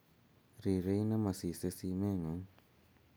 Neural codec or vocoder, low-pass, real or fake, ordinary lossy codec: none; none; real; none